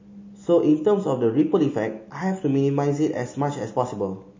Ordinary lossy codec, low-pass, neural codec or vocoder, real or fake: MP3, 32 kbps; 7.2 kHz; none; real